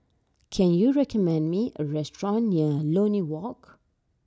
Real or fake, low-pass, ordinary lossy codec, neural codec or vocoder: real; none; none; none